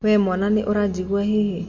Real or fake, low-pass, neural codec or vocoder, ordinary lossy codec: real; 7.2 kHz; none; MP3, 48 kbps